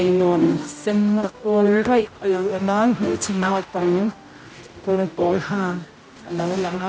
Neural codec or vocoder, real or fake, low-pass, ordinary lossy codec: codec, 16 kHz, 0.5 kbps, X-Codec, HuBERT features, trained on general audio; fake; none; none